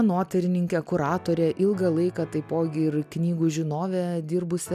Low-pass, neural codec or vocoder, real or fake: 14.4 kHz; none; real